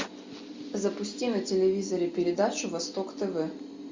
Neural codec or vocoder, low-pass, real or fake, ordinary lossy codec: none; 7.2 kHz; real; MP3, 64 kbps